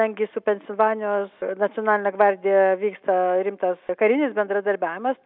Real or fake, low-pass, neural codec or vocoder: real; 5.4 kHz; none